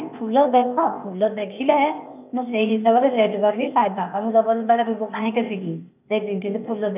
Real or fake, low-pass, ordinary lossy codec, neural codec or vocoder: fake; 3.6 kHz; none; codec, 16 kHz, 0.8 kbps, ZipCodec